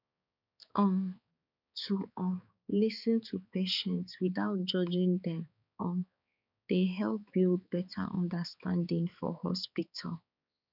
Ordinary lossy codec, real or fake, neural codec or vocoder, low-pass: none; fake; codec, 16 kHz, 4 kbps, X-Codec, HuBERT features, trained on balanced general audio; 5.4 kHz